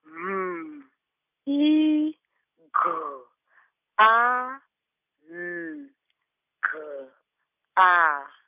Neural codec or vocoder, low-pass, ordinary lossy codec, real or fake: none; 3.6 kHz; none; real